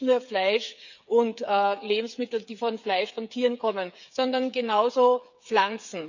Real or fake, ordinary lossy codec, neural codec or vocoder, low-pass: fake; none; codec, 16 kHz, 8 kbps, FreqCodec, smaller model; 7.2 kHz